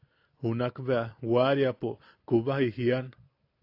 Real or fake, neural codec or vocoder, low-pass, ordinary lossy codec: real; none; 5.4 kHz; AAC, 32 kbps